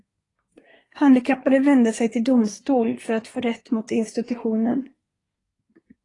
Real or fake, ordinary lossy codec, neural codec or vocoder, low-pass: fake; AAC, 32 kbps; codec, 24 kHz, 1 kbps, SNAC; 10.8 kHz